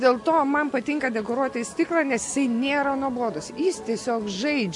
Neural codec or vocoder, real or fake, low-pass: none; real; 10.8 kHz